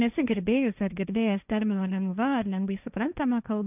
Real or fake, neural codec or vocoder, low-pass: fake; codec, 16 kHz, 1.1 kbps, Voila-Tokenizer; 3.6 kHz